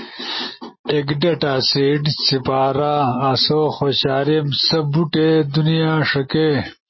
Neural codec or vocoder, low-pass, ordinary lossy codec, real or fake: none; 7.2 kHz; MP3, 24 kbps; real